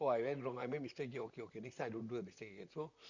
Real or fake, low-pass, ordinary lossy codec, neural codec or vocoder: fake; 7.2 kHz; Opus, 64 kbps; vocoder, 44.1 kHz, 128 mel bands, Pupu-Vocoder